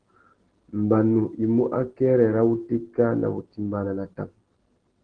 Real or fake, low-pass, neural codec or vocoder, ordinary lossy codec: real; 9.9 kHz; none; Opus, 16 kbps